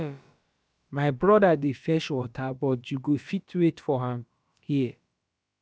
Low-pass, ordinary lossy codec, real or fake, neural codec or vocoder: none; none; fake; codec, 16 kHz, about 1 kbps, DyCAST, with the encoder's durations